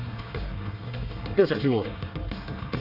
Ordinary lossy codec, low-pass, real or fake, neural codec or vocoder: none; 5.4 kHz; fake; codec, 24 kHz, 1 kbps, SNAC